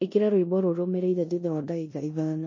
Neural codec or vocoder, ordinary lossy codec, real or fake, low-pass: codec, 16 kHz in and 24 kHz out, 0.9 kbps, LongCat-Audio-Codec, four codebook decoder; MP3, 32 kbps; fake; 7.2 kHz